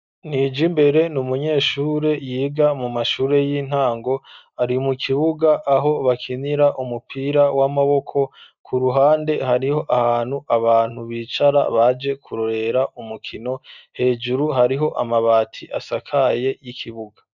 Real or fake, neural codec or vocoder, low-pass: real; none; 7.2 kHz